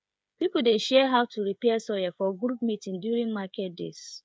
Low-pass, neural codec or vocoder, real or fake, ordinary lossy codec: none; codec, 16 kHz, 8 kbps, FreqCodec, smaller model; fake; none